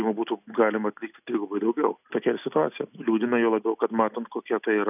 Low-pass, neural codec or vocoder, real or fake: 3.6 kHz; none; real